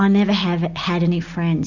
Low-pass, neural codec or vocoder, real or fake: 7.2 kHz; none; real